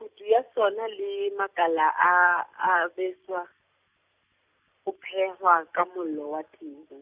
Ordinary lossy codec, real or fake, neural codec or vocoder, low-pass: Opus, 64 kbps; real; none; 3.6 kHz